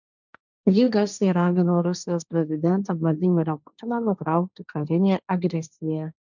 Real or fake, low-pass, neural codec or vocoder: fake; 7.2 kHz; codec, 16 kHz, 1.1 kbps, Voila-Tokenizer